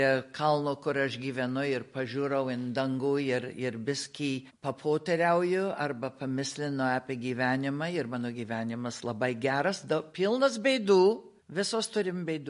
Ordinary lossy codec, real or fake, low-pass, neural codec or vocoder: MP3, 48 kbps; real; 10.8 kHz; none